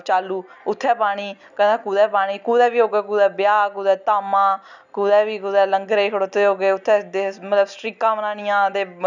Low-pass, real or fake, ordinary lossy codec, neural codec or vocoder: 7.2 kHz; real; none; none